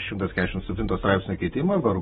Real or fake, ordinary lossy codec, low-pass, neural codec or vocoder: real; AAC, 16 kbps; 19.8 kHz; none